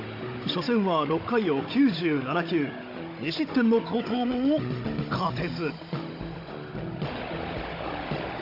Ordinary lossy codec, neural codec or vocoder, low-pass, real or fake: AAC, 32 kbps; codec, 16 kHz, 16 kbps, FunCodec, trained on Chinese and English, 50 frames a second; 5.4 kHz; fake